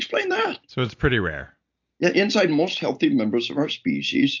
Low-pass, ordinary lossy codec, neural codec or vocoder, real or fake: 7.2 kHz; AAC, 48 kbps; none; real